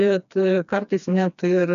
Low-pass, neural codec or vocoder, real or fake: 7.2 kHz; codec, 16 kHz, 2 kbps, FreqCodec, smaller model; fake